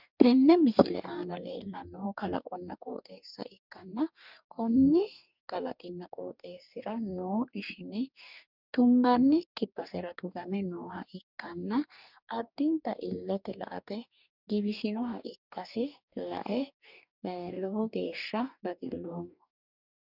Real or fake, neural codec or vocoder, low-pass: fake; codec, 44.1 kHz, 2.6 kbps, DAC; 5.4 kHz